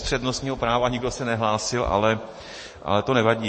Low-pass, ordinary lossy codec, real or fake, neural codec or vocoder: 9.9 kHz; MP3, 32 kbps; fake; vocoder, 44.1 kHz, 128 mel bands every 512 samples, BigVGAN v2